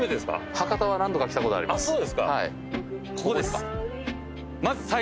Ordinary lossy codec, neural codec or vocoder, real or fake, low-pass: none; none; real; none